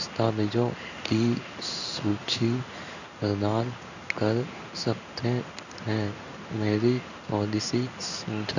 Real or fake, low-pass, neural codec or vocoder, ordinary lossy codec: fake; 7.2 kHz; codec, 16 kHz in and 24 kHz out, 1 kbps, XY-Tokenizer; none